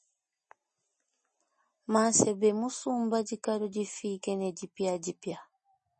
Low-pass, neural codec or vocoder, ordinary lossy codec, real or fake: 10.8 kHz; none; MP3, 32 kbps; real